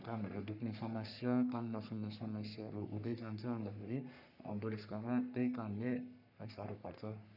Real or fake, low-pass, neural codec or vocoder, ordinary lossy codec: fake; 5.4 kHz; codec, 44.1 kHz, 3.4 kbps, Pupu-Codec; none